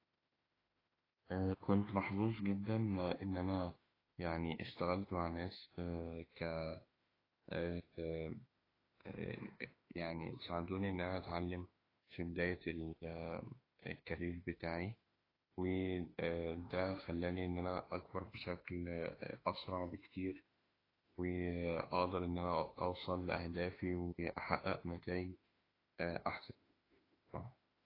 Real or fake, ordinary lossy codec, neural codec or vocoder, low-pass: fake; AAC, 24 kbps; autoencoder, 48 kHz, 32 numbers a frame, DAC-VAE, trained on Japanese speech; 5.4 kHz